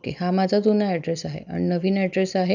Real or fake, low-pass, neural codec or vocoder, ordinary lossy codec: real; 7.2 kHz; none; none